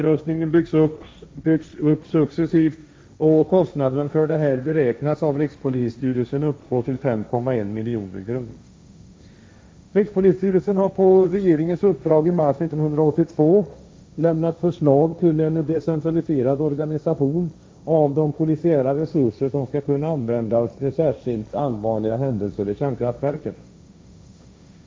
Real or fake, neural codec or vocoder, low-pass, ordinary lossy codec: fake; codec, 16 kHz, 1.1 kbps, Voila-Tokenizer; none; none